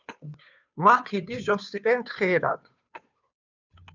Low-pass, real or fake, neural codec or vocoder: 7.2 kHz; fake; codec, 16 kHz, 2 kbps, FunCodec, trained on Chinese and English, 25 frames a second